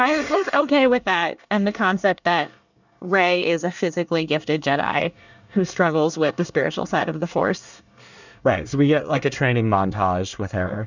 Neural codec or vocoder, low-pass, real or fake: codec, 24 kHz, 1 kbps, SNAC; 7.2 kHz; fake